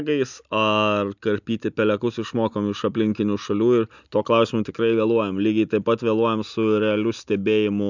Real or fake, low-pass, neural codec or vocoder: real; 7.2 kHz; none